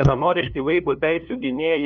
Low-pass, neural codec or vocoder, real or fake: 7.2 kHz; codec, 16 kHz, 2 kbps, FunCodec, trained on LibriTTS, 25 frames a second; fake